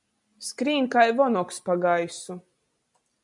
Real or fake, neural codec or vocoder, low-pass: real; none; 10.8 kHz